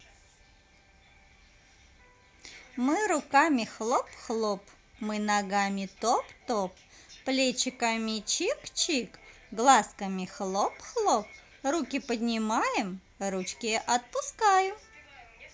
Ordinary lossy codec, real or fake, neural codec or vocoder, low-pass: none; real; none; none